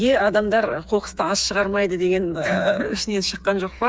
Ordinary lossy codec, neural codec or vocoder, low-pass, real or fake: none; codec, 16 kHz, 4 kbps, FreqCodec, smaller model; none; fake